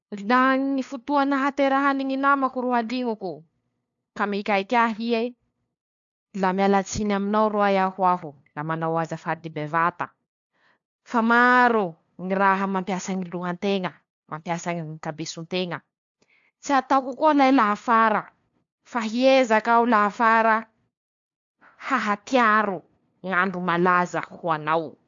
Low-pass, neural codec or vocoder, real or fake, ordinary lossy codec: 7.2 kHz; codec, 16 kHz, 2 kbps, FunCodec, trained on LibriTTS, 25 frames a second; fake; AAC, 64 kbps